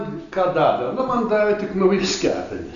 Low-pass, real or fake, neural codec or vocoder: 7.2 kHz; real; none